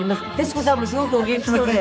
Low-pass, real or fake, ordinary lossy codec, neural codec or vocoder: none; fake; none; codec, 16 kHz, 4 kbps, X-Codec, HuBERT features, trained on general audio